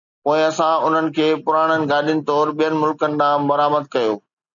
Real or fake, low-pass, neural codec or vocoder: real; 7.2 kHz; none